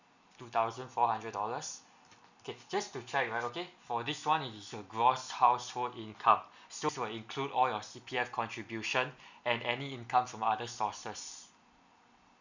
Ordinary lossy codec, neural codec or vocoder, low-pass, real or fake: none; none; 7.2 kHz; real